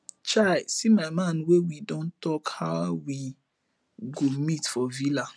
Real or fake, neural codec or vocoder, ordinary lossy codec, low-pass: real; none; none; none